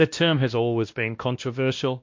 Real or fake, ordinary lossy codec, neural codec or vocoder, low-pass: fake; MP3, 48 kbps; codec, 16 kHz, 1 kbps, X-Codec, WavLM features, trained on Multilingual LibriSpeech; 7.2 kHz